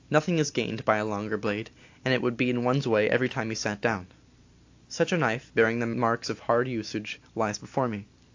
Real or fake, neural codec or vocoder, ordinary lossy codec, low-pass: real; none; AAC, 48 kbps; 7.2 kHz